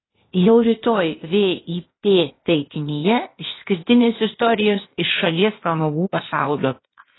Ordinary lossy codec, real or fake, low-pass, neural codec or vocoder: AAC, 16 kbps; fake; 7.2 kHz; codec, 16 kHz, 0.8 kbps, ZipCodec